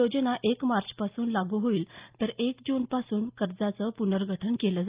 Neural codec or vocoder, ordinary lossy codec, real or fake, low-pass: none; Opus, 32 kbps; real; 3.6 kHz